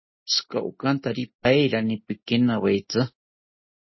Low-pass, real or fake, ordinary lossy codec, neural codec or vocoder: 7.2 kHz; real; MP3, 24 kbps; none